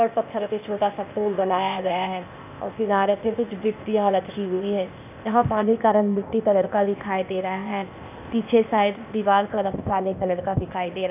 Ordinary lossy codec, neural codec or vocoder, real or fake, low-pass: none; codec, 16 kHz, 0.8 kbps, ZipCodec; fake; 3.6 kHz